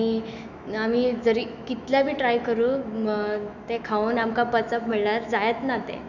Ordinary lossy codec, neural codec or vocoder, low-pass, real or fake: none; autoencoder, 48 kHz, 128 numbers a frame, DAC-VAE, trained on Japanese speech; 7.2 kHz; fake